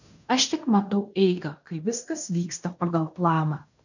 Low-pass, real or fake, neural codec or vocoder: 7.2 kHz; fake; codec, 16 kHz in and 24 kHz out, 0.9 kbps, LongCat-Audio-Codec, fine tuned four codebook decoder